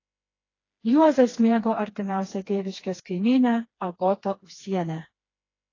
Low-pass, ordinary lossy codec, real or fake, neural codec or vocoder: 7.2 kHz; AAC, 32 kbps; fake; codec, 16 kHz, 2 kbps, FreqCodec, smaller model